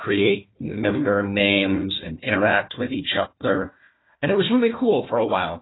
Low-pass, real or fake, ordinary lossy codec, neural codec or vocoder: 7.2 kHz; fake; AAC, 16 kbps; codec, 16 kHz, 1 kbps, FunCodec, trained on Chinese and English, 50 frames a second